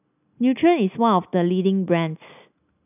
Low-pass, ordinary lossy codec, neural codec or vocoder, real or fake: 3.6 kHz; none; none; real